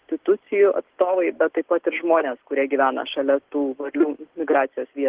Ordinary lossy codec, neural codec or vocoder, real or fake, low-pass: Opus, 16 kbps; none; real; 3.6 kHz